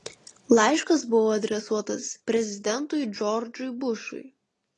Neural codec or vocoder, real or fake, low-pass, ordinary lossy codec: none; real; 10.8 kHz; AAC, 32 kbps